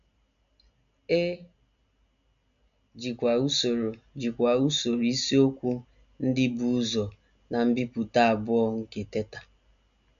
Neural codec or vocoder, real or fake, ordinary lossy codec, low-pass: none; real; none; 7.2 kHz